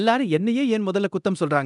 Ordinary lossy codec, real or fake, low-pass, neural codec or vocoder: none; fake; 10.8 kHz; codec, 24 kHz, 0.9 kbps, DualCodec